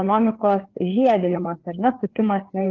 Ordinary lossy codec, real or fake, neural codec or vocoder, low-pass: Opus, 16 kbps; fake; codec, 16 kHz, 4 kbps, FreqCodec, larger model; 7.2 kHz